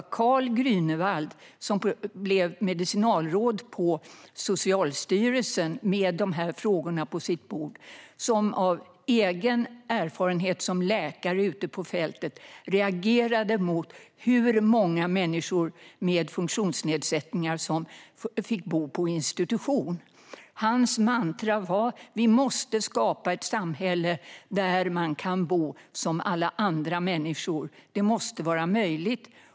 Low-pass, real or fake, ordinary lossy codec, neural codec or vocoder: none; real; none; none